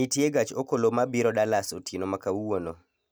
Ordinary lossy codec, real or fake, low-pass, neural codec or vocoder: none; real; none; none